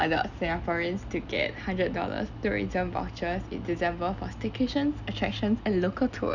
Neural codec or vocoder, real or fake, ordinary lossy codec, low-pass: none; real; none; 7.2 kHz